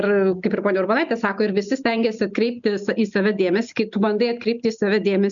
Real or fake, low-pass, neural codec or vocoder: real; 7.2 kHz; none